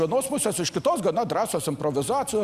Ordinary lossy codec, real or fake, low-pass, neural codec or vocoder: Opus, 64 kbps; real; 14.4 kHz; none